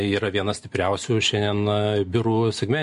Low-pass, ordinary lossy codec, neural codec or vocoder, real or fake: 10.8 kHz; MP3, 48 kbps; none; real